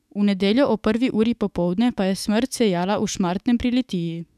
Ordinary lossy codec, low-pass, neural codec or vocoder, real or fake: none; 14.4 kHz; autoencoder, 48 kHz, 128 numbers a frame, DAC-VAE, trained on Japanese speech; fake